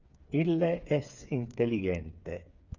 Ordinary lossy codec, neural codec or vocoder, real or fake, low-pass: Opus, 64 kbps; codec, 16 kHz, 8 kbps, FreqCodec, smaller model; fake; 7.2 kHz